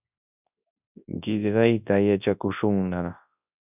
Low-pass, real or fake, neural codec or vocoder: 3.6 kHz; fake; codec, 24 kHz, 0.9 kbps, WavTokenizer, large speech release